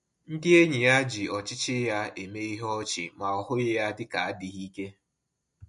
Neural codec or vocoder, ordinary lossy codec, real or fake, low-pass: none; MP3, 48 kbps; real; 10.8 kHz